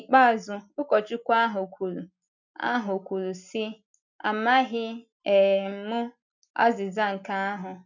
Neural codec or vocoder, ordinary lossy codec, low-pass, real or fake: none; none; 7.2 kHz; real